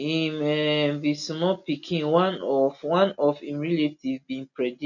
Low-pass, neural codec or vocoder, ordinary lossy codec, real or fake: 7.2 kHz; none; none; real